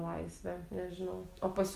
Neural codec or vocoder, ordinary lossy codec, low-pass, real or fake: none; Opus, 32 kbps; 14.4 kHz; real